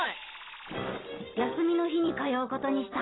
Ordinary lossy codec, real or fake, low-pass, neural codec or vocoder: AAC, 16 kbps; real; 7.2 kHz; none